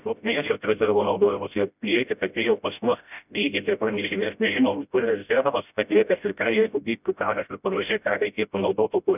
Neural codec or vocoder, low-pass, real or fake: codec, 16 kHz, 0.5 kbps, FreqCodec, smaller model; 3.6 kHz; fake